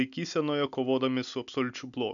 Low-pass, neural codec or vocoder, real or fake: 7.2 kHz; none; real